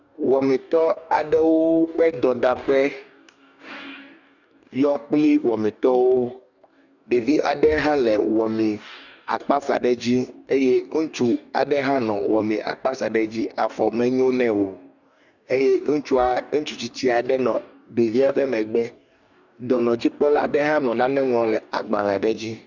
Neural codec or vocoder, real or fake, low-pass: codec, 44.1 kHz, 2.6 kbps, DAC; fake; 7.2 kHz